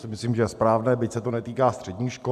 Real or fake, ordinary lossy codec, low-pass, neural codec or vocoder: real; MP3, 96 kbps; 14.4 kHz; none